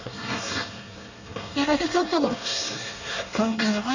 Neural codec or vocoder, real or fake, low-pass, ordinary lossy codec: codec, 24 kHz, 1 kbps, SNAC; fake; 7.2 kHz; none